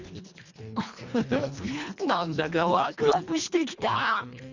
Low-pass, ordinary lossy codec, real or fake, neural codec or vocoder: 7.2 kHz; Opus, 64 kbps; fake; codec, 24 kHz, 1.5 kbps, HILCodec